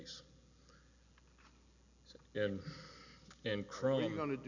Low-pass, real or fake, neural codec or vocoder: 7.2 kHz; real; none